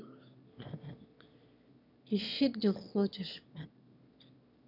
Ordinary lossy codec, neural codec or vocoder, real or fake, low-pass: none; autoencoder, 22.05 kHz, a latent of 192 numbers a frame, VITS, trained on one speaker; fake; 5.4 kHz